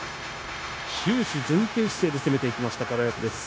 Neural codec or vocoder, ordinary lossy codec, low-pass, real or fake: codec, 16 kHz, 0.9 kbps, LongCat-Audio-Codec; none; none; fake